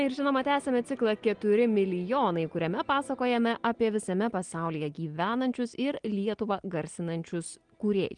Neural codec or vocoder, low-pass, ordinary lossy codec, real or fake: none; 9.9 kHz; Opus, 24 kbps; real